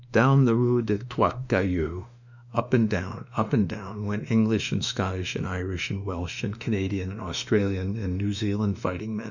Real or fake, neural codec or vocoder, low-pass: fake; autoencoder, 48 kHz, 32 numbers a frame, DAC-VAE, trained on Japanese speech; 7.2 kHz